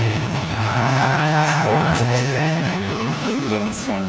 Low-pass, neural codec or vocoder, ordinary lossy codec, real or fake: none; codec, 16 kHz, 1 kbps, FunCodec, trained on LibriTTS, 50 frames a second; none; fake